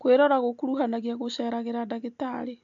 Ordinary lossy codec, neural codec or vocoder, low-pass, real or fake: AAC, 64 kbps; none; 7.2 kHz; real